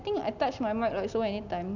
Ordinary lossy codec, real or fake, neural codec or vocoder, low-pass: none; real; none; 7.2 kHz